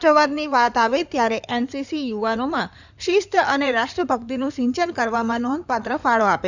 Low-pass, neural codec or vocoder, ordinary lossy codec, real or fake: 7.2 kHz; codec, 16 kHz in and 24 kHz out, 2.2 kbps, FireRedTTS-2 codec; none; fake